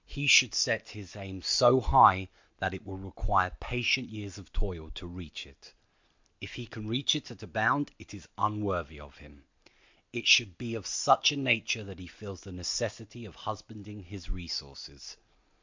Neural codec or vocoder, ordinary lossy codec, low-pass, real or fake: none; MP3, 64 kbps; 7.2 kHz; real